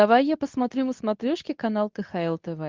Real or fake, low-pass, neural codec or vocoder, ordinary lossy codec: fake; 7.2 kHz; codec, 24 kHz, 0.9 kbps, WavTokenizer, medium speech release version 2; Opus, 32 kbps